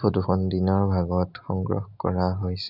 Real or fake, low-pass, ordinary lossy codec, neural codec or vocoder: real; 5.4 kHz; none; none